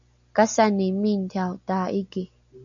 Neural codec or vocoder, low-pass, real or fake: none; 7.2 kHz; real